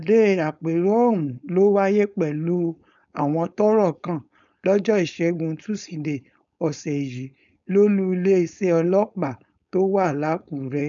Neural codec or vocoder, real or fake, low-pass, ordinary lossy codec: codec, 16 kHz, 4.8 kbps, FACodec; fake; 7.2 kHz; none